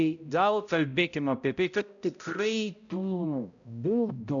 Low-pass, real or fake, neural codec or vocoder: 7.2 kHz; fake; codec, 16 kHz, 0.5 kbps, X-Codec, HuBERT features, trained on balanced general audio